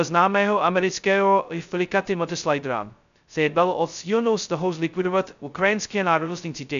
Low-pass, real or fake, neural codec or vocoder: 7.2 kHz; fake; codec, 16 kHz, 0.2 kbps, FocalCodec